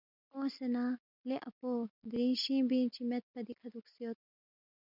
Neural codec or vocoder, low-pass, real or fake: none; 5.4 kHz; real